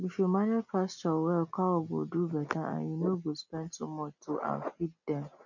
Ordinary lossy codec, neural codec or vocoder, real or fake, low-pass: none; none; real; 7.2 kHz